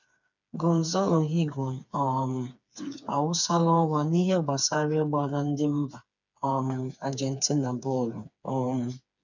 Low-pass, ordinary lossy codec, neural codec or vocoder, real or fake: 7.2 kHz; none; codec, 16 kHz, 4 kbps, FreqCodec, smaller model; fake